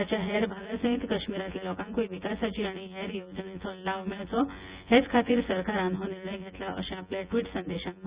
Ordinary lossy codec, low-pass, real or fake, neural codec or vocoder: Opus, 64 kbps; 3.6 kHz; fake; vocoder, 24 kHz, 100 mel bands, Vocos